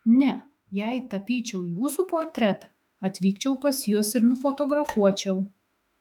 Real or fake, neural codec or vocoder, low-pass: fake; autoencoder, 48 kHz, 32 numbers a frame, DAC-VAE, trained on Japanese speech; 19.8 kHz